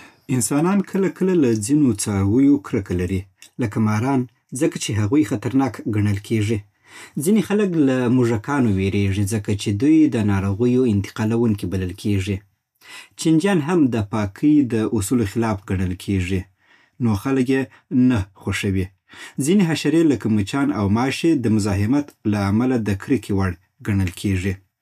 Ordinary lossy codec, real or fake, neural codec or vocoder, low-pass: none; real; none; 14.4 kHz